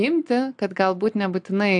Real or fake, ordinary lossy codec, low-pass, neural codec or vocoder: real; AAC, 64 kbps; 9.9 kHz; none